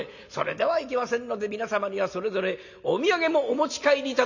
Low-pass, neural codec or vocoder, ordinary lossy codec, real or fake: 7.2 kHz; none; none; real